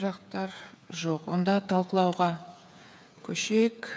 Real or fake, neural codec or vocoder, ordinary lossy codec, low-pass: fake; codec, 16 kHz, 8 kbps, FreqCodec, smaller model; none; none